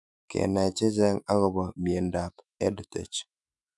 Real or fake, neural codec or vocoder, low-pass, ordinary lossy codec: fake; autoencoder, 48 kHz, 128 numbers a frame, DAC-VAE, trained on Japanese speech; 10.8 kHz; none